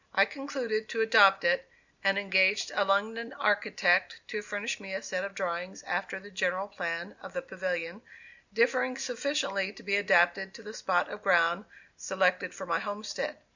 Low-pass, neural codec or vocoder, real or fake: 7.2 kHz; none; real